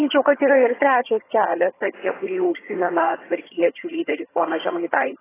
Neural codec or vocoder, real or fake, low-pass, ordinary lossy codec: vocoder, 22.05 kHz, 80 mel bands, HiFi-GAN; fake; 3.6 kHz; AAC, 16 kbps